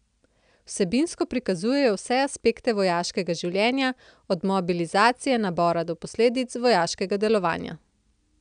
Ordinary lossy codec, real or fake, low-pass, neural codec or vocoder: none; real; 9.9 kHz; none